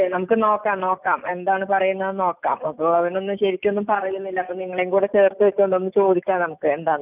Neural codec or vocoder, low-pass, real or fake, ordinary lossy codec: none; 3.6 kHz; real; none